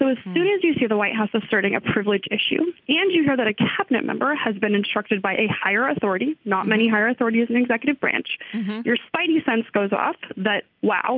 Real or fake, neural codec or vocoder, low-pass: real; none; 5.4 kHz